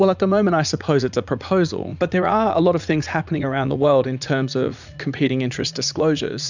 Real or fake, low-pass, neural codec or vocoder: fake; 7.2 kHz; vocoder, 44.1 kHz, 80 mel bands, Vocos